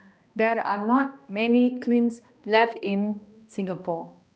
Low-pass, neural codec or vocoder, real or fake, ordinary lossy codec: none; codec, 16 kHz, 1 kbps, X-Codec, HuBERT features, trained on balanced general audio; fake; none